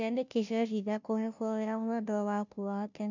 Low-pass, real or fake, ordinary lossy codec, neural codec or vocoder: 7.2 kHz; fake; none; codec, 16 kHz, 0.5 kbps, FunCodec, trained on Chinese and English, 25 frames a second